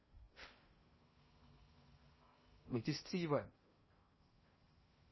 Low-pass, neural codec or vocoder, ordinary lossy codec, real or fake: 7.2 kHz; codec, 16 kHz in and 24 kHz out, 0.6 kbps, FocalCodec, streaming, 2048 codes; MP3, 24 kbps; fake